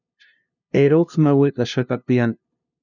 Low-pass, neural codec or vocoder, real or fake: 7.2 kHz; codec, 16 kHz, 0.5 kbps, FunCodec, trained on LibriTTS, 25 frames a second; fake